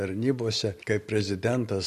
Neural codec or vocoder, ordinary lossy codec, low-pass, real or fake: none; MP3, 64 kbps; 14.4 kHz; real